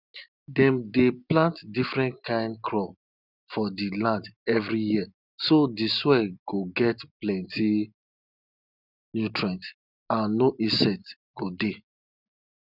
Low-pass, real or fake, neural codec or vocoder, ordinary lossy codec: 5.4 kHz; real; none; none